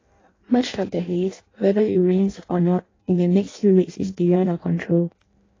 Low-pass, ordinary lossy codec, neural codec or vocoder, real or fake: 7.2 kHz; AAC, 32 kbps; codec, 16 kHz in and 24 kHz out, 0.6 kbps, FireRedTTS-2 codec; fake